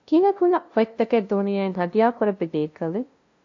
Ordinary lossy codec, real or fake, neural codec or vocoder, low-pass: AAC, 48 kbps; fake; codec, 16 kHz, 0.5 kbps, FunCodec, trained on LibriTTS, 25 frames a second; 7.2 kHz